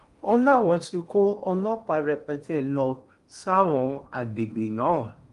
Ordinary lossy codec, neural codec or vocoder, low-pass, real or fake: Opus, 32 kbps; codec, 16 kHz in and 24 kHz out, 0.8 kbps, FocalCodec, streaming, 65536 codes; 10.8 kHz; fake